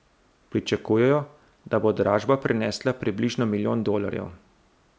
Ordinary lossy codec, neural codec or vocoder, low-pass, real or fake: none; none; none; real